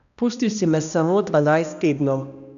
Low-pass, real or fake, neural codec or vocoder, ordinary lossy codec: 7.2 kHz; fake; codec, 16 kHz, 1 kbps, X-Codec, HuBERT features, trained on balanced general audio; none